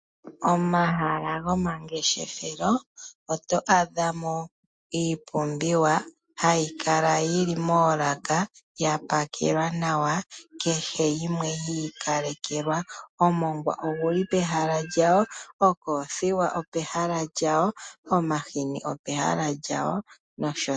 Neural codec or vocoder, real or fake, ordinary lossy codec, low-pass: none; real; MP3, 48 kbps; 9.9 kHz